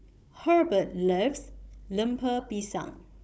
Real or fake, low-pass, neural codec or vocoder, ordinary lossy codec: fake; none; codec, 16 kHz, 16 kbps, FunCodec, trained on Chinese and English, 50 frames a second; none